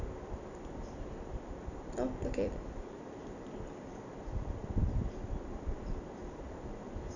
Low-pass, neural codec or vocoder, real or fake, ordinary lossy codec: 7.2 kHz; vocoder, 44.1 kHz, 128 mel bands every 256 samples, BigVGAN v2; fake; none